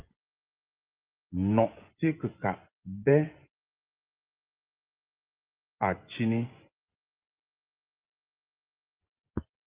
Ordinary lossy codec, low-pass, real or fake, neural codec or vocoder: Opus, 24 kbps; 3.6 kHz; fake; vocoder, 24 kHz, 100 mel bands, Vocos